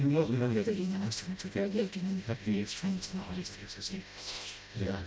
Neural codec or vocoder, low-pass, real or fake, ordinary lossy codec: codec, 16 kHz, 0.5 kbps, FreqCodec, smaller model; none; fake; none